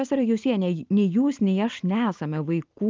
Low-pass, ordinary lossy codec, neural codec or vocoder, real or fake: 7.2 kHz; Opus, 32 kbps; none; real